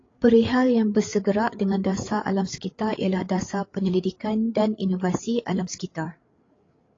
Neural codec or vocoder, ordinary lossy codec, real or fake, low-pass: codec, 16 kHz, 8 kbps, FreqCodec, larger model; AAC, 32 kbps; fake; 7.2 kHz